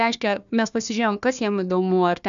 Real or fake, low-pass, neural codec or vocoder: fake; 7.2 kHz; codec, 16 kHz, 1 kbps, FunCodec, trained on Chinese and English, 50 frames a second